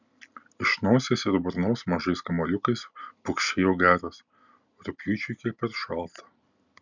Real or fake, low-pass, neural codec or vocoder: real; 7.2 kHz; none